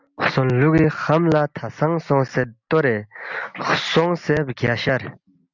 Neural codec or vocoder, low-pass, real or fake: none; 7.2 kHz; real